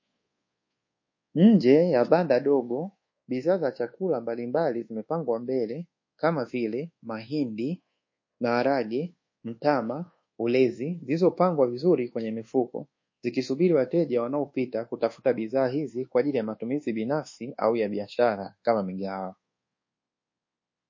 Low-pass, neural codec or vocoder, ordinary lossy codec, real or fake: 7.2 kHz; codec, 24 kHz, 1.2 kbps, DualCodec; MP3, 32 kbps; fake